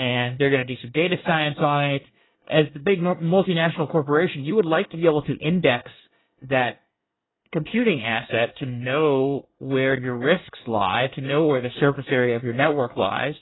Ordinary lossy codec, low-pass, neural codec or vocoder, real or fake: AAC, 16 kbps; 7.2 kHz; codec, 24 kHz, 1 kbps, SNAC; fake